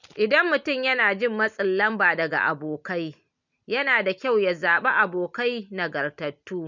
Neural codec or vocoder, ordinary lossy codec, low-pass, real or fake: none; none; 7.2 kHz; real